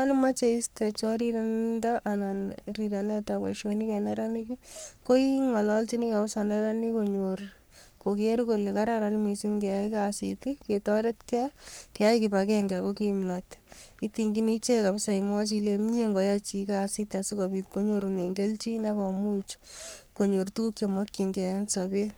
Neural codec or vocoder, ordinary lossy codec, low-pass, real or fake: codec, 44.1 kHz, 3.4 kbps, Pupu-Codec; none; none; fake